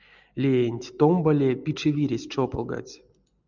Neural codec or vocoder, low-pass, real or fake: none; 7.2 kHz; real